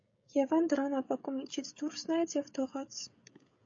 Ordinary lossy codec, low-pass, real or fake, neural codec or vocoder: AAC, 48 kbps; 7.2 kHz; fake; codec, 16 kHz, 16 kbps, FreqCodec, smaller model